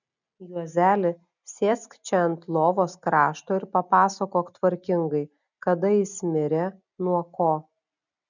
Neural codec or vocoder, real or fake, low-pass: none; real; 7.2 kHz